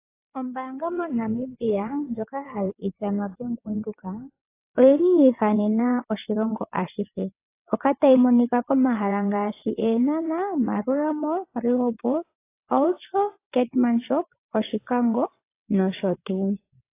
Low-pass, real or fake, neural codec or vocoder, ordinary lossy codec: 3.6 kHz; fake; vocoder, 22.05 kHz, 80 mel bands, WaveNeXt; AAC, 24 kbps